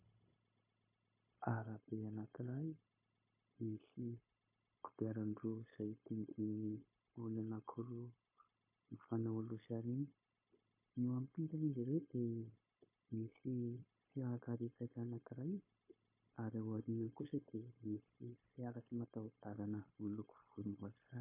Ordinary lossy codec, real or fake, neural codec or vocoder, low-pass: MP3, 24 kbps; fake; codec, 16 kHz, 0.9 kbps, LongCat-Audio-Codec; 3.6 kHz